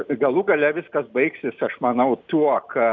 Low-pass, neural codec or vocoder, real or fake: 7.2 kHz; none; real